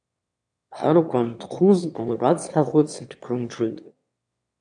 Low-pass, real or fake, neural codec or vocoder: 9.9 kHz; fake; autoencoder, 22.05 kHz, a latent of 192 numbers a frame, VITS, trained on one speaker